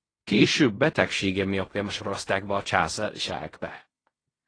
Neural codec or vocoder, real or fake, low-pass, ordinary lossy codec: codec, 16 kHz in and 24 kHz out, 0.4 kbps, LongCat-Audio-Codec, fine tuned four codebook decoder; fake; 9.9 kHz; AAC, 32 kbps